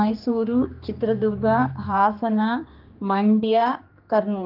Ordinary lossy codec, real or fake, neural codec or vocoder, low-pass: Opus, 24 kbps; fake; codec, 16 kHz, 2 kbps, X-Codec, HuBERT features, trained on general audio; 5.4 kHz